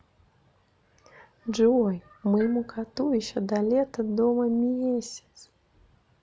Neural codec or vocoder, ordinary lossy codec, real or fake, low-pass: none; none; real; none